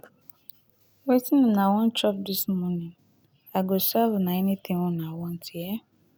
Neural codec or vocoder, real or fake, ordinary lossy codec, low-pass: none; real; none; none